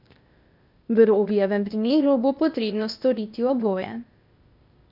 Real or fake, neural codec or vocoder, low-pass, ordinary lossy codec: fake; codec, 16 kHz, 0.8 kbps, ZipCodec; 5.4 kHz; none